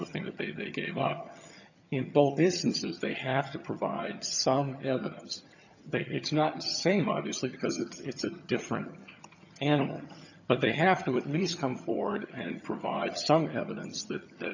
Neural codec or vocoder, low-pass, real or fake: vocoder, 22.05 kHz, 80 mel bands, HiFi-GAN; 7.2 kHz; fake